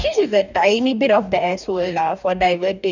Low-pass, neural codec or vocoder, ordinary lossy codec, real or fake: 7.2 kHz; codec, 44.1 kHz, 2.6 kbps, DAC; none; fake